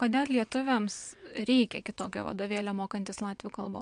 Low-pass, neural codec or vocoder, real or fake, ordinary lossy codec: 9.9 kHz; vocoder, 22.05 kHz, 80 mel bands, WaveNeXt; fake; MP3, 64 kbps